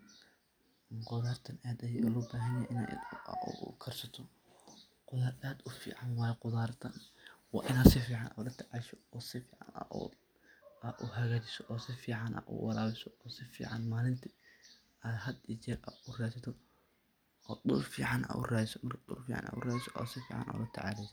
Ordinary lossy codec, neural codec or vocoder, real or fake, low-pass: none; none; real; none